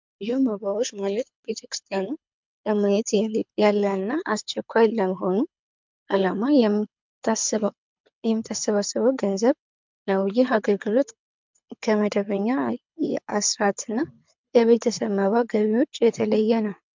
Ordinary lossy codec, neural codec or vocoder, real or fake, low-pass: MP3, 64 kbps; codec, 24 kHz, 6 kbps, HILCodec; fake; 7.2 kHz